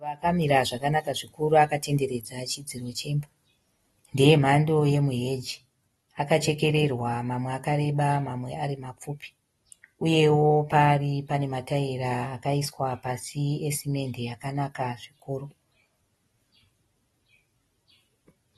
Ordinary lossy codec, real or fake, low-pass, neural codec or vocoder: AAC, 32 kbps; real; 19.8 kHz; none